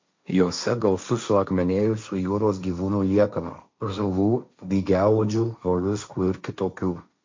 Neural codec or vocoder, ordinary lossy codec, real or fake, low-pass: codec, 16 kHz, 1.1 kbps, Voila-Tokenizer; MP3, 64 kbps; fake; 7.2 kHz